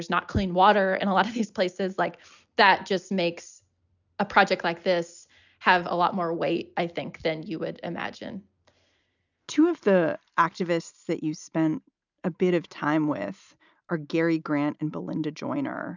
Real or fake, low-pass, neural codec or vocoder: real; 7.2 kHz; none